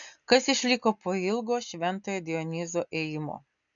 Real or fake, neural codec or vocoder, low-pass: real; none; 7.2 kHz